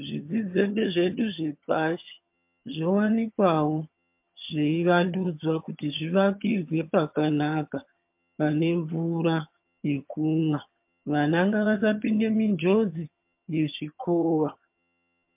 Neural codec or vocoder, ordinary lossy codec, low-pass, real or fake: vocoder, 22.05 kHz, 80 mel bands, HiFi-GAN; MP3, 32 kbps; 3.6 kHz; fake